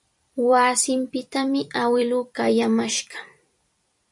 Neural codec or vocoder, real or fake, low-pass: vocoder, 44.1 kHz, 128 mel bands every 256 samples, BigVGAN v2; fake; 10.8 kHz